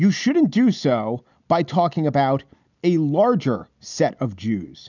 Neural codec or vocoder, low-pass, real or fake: none; 7.2 kHz; real